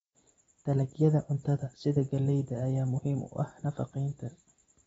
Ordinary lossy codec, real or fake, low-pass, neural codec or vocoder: AAC, 24 kbps; fake; 19.8 kHz; vocoder, 44.1 kHz, 128 mel bands every 256 samples, BigVGAN v2